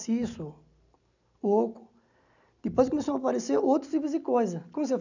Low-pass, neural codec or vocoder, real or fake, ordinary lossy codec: 7.2 kHz; none; real; none